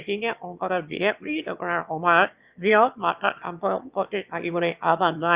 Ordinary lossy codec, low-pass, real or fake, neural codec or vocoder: Opus, 64 kbps; 3.6 kHz; fake; autoencoder, 22.05 kHz, a latent of 192 numbers a frame, VITS, trained on one speaker